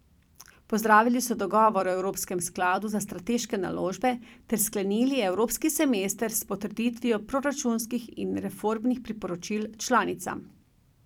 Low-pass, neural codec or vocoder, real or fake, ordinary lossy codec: 19.8 kHz; vocoder, 44.1 kHz, 128 mel bands every 256 samples, BigVGAN v2; fake; none